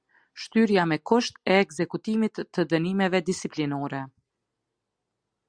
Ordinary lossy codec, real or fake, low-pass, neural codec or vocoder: Opus, 64 kbps; real; 9.9 kHz; none